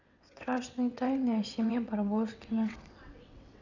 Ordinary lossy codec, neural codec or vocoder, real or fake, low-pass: none; vocoder, 22.05 kHz, 80 mel bands, WaveNeXt; fake; 7.2 kHz